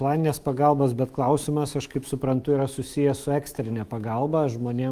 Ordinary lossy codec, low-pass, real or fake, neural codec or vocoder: Opus, 32 kbps; 14.4 kHz; fake; autoencoder, 48 kHz, 128 numbers a frame, DAC-VAE, trained on Japanese speech